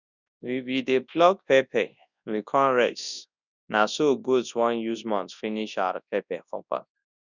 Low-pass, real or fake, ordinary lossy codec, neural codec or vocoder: 7.2 kHz; fake; none; codec, 24 kHz, 0.9 kbps, WavTokenizer, large speech release